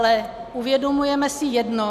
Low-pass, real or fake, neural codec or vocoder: 14.4 kHz; real; none